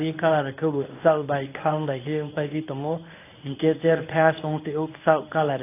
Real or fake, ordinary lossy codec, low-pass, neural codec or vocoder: fake; AAC, 24 kbps; 3.6 kHz; codec, 24 kHz, 0.9 kbps, WavTokenizer, medium speech release version 1